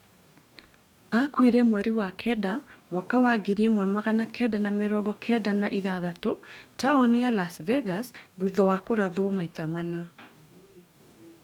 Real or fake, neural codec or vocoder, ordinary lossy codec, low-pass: fake; codec, 44.1 kHz, 2.6 kbps, DAC; none; 19.8 kHz